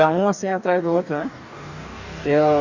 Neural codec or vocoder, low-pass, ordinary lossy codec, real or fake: codec, 44.1 kHz, 2.6 kbps, DAC; 7.2 kHz; none; fake